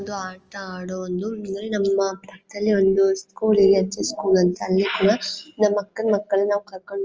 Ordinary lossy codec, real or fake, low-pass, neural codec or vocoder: Opus, 24 kbps; real; 7.2 kHz; none